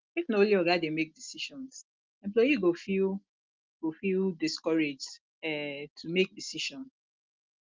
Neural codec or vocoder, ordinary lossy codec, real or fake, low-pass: none; Opus, 32 kbps; real; 7.2 kHz